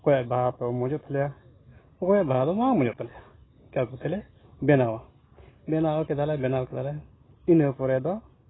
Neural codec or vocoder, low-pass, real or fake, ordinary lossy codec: none; 7.2 kHz; real; AAC, 16 kbps